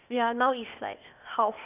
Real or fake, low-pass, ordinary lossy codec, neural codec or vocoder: fake; 3.6 kHz; none; codec, 16 kHz, 0.8 kbps, ZipCodec